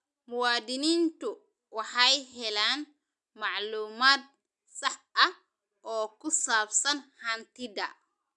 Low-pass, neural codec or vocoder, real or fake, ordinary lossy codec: 10.8 kHz; none; real; none